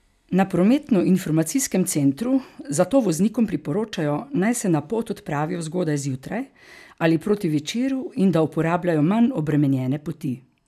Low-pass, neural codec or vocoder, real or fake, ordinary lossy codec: 14.4 kHz; none; real; none